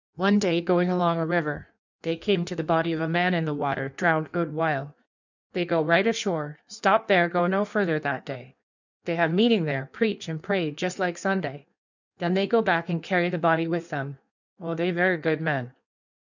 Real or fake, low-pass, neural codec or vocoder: fake; 7.2 kHz; codec, 16 kHz in and 24 kHz out, 1.1 kbps, FireRedTTS-2 codec